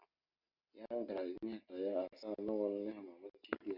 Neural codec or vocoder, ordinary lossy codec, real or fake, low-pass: none; Opus, 64 kbps; real; 5.4 kHz